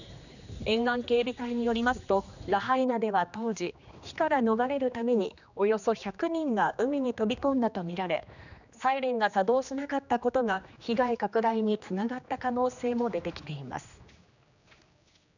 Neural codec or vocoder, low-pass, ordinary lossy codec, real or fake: codec, 16 kHz, 2 kbps, X-Codec, HuBERT features, trained on general audio; 7.2 kHz; none; fake